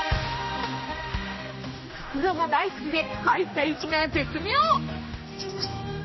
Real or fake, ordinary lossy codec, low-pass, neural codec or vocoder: fake; MP3, 24 kbps; 7.2 kHz; codec, 16 kHz, 1 kbps, X-Codec, HuBERT features, trained on general audio